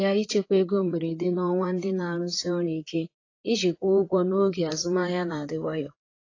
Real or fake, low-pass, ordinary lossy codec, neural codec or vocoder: fake; 7.2 kHz; AAC, 32 kbps; vocoder, 44.1 kHz, 128 mel bands, Pupu-Vocoder